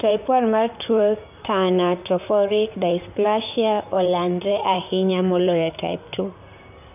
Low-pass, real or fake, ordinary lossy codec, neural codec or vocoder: 3.6 kHz; fake; none; vocoder, 44.1 kHz, 128 mel bands, Pupu-Vocoder